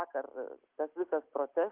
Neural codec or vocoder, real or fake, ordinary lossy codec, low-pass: none; real; Opus, 32 kbps; 3.6 kHz